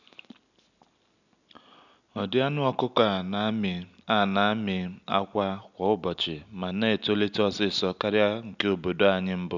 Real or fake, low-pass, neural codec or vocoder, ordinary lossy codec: real; 7.2 kHz; none; none